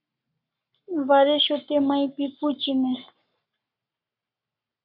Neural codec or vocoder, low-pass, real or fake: codec, 44.1 kHz, 7.8 kbps, Pupu-Codec; 5.4 kHz; fake